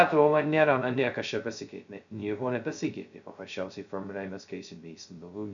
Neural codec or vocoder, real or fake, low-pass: codec, 16 kHz, 0.2 kbps, FocalCodec; fake; 7.2 kHz